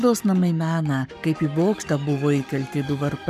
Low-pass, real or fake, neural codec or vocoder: 14.4 kHz; fake; codec, 44.1 kHz, 7.8 kbps, Pupu-Codec